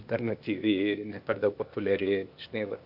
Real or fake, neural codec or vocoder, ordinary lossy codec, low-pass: fake; codec, 16 kHz, 0.8 kbps, ZipCodec; AAC, 48 kbps; 5.4 kHz